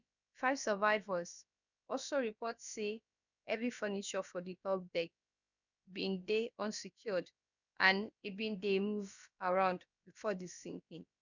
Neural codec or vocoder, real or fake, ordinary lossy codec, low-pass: codec, 16 kHz, about 1 kbps, DyCAST, with the encoder's durations; fake; none; 7.2 kHz